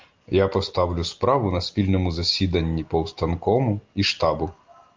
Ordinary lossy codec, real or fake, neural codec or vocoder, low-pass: Opus, 32 kbps; real; none; 7.2 kHz